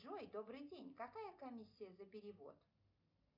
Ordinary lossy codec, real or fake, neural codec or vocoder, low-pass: MP3, 48 kbps; real; none; 5.4 kHz